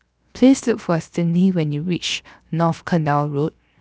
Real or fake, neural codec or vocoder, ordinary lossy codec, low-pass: fake; codec, 16 kHz, 0.7 kbps, FocalCodec; none; none